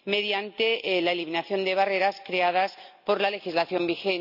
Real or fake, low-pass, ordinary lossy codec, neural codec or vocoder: real; 5.4 kHz; AAC, 48 kbps; none